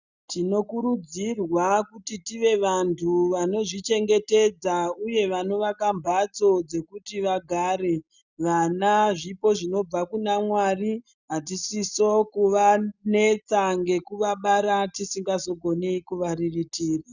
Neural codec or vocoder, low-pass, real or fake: none; 7.2 kHz; real